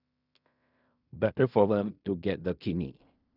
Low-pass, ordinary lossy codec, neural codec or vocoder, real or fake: 5.4 kHz; none; codec, 16 kHz in and 24 kHz out, 0.4 kbps, LongCat-Audio-Codec, fine tuned four codebook decoder; fake